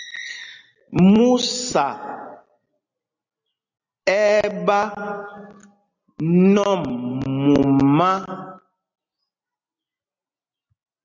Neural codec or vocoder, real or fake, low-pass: none; real; 7.2 kHz